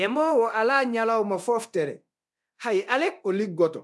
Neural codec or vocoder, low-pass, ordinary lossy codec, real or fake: codec, 24 kHz, 0.9 kbps, DualCodec; none; none; fake